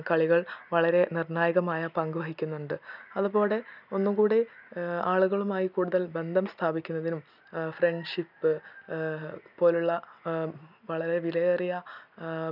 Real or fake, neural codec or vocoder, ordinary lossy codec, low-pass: real; none; none; 5.4 kHz